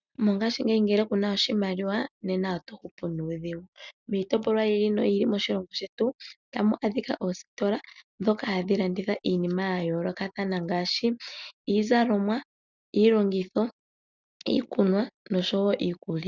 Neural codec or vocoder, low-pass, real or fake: none; 7.2 kHz; real